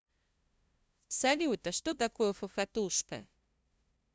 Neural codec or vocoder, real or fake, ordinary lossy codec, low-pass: codec, 16 kHz, 0.5 kbps, FunCodec, trained on LibriTTS, 25 frames a second; fake; none; none